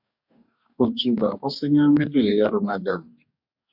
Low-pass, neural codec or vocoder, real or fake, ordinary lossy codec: 5.4 kHz; codec, 44.1 kHz, 2.6 kbps, DAC; fake; MP3, 48 kbps